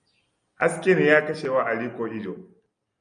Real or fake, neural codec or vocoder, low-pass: real; none; 9.9 kHz